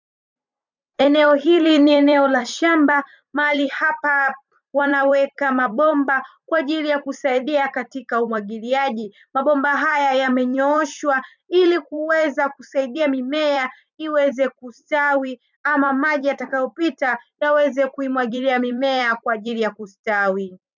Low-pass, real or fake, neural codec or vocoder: 7.2 kHz; fake; vocoder, 44.1 kHz, 128 mel bands every 256 samples, BigVGAN v2